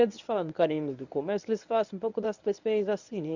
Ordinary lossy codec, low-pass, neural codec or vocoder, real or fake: none; 7.2 kHz; codec, 24 kHz, 0.9 kbps, WavTokenizer, medium speech release version 1; fake